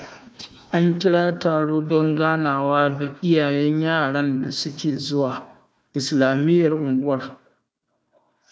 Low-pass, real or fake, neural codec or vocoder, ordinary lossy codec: none; fake; codec, 16 kHz, 1 kbps, FunCodec, trained on Chinese and English, 50 frames a second; none